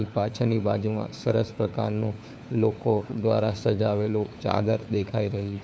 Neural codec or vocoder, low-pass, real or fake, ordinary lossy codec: codec, 16 kHz, 4 kbps, FunCodec, trained on LibriTTS, 50 frames a second; none; fake; none